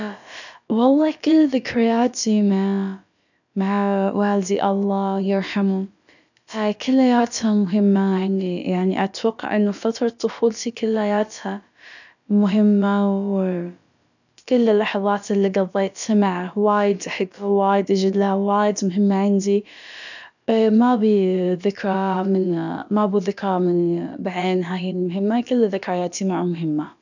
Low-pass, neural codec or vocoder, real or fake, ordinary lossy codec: 7.2 kHz; codec, 16 kHz, about 1 kbps, DyCAST, with the encoder's durations; fake; none